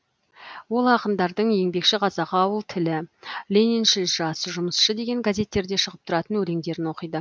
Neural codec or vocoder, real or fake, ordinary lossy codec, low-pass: none; real; none; none